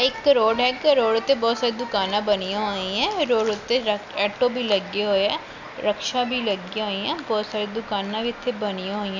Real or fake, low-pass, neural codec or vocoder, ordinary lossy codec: real; 7.2 kHz; none; none